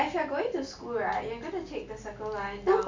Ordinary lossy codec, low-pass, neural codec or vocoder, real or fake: MP3, 64 kbps; 7.2 kHz; none; real